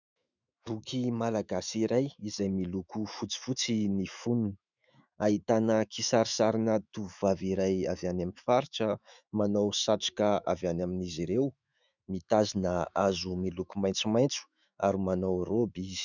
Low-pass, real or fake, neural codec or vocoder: 7.2 kHz; fake; autoencoder, 48 kHz, 128 numbers a frame, DAC-VAE, trained on Japanese speech